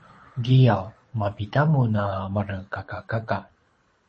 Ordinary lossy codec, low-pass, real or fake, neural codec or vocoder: MP3, 32 kbps; 9.9 kHz; fake; codec, 24 kHz, 6 kbps, HILCodec